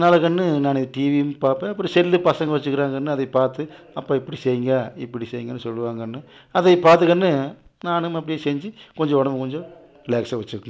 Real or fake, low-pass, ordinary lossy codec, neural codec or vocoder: real; none; none; none